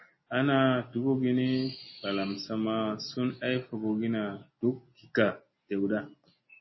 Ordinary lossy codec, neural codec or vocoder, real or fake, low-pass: MP3, 24 kbps; none; real; 7.2 kHz